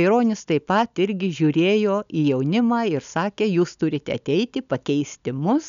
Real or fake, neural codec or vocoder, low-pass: real; none; 7.2 kHz